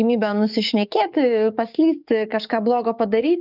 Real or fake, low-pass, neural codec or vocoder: fake; 5.4 kHz; codec, 44.1 kHz, 7.8 kbps, DAC